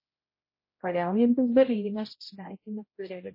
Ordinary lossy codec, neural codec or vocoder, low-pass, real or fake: MP3, 24 kbps; codec, 16 kHz, 0.5 kbps, X-Codec, HuBERT features, trained on general audio; 5.4 kHz; fake